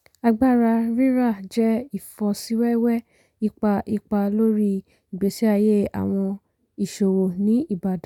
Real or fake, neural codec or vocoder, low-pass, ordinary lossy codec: real; none; 19.8 kHz; none